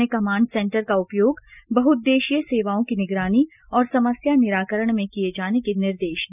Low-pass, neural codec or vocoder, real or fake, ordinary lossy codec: 3.6 kHz; none; real; none